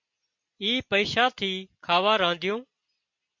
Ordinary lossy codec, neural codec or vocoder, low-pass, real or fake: MP3, 48 kbps; none; 7.2 kHz; real